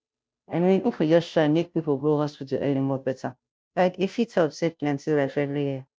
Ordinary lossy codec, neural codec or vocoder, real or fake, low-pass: none; codec, 16 kHz, 0.5 kbps, FunCodec, trained on Chinese and English, 25 frames a second; fake; none